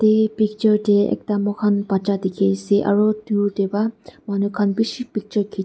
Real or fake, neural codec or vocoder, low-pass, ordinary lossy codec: real; none; none; none